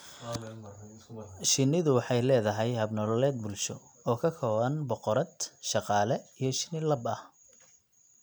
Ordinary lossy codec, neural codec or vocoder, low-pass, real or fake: none; none; none; real